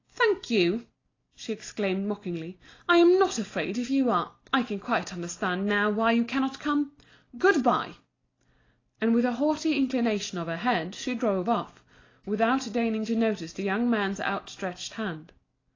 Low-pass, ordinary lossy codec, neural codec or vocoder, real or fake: 7.2 kHz; AAC, 32 kbps; none; real